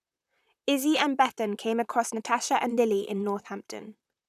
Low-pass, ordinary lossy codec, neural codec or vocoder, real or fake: 14.4 kHz; none; vocoder, 44.1 kHz, 128 mel bands, Pupu-Vocoder; fake